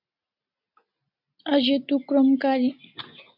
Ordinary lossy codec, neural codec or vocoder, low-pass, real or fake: MP3, 48 kbps; none; 5.4 kHz; real